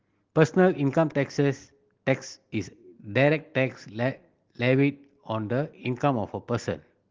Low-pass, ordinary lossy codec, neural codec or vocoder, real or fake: 7.2 kHz; Opus, 16 kbps; none; real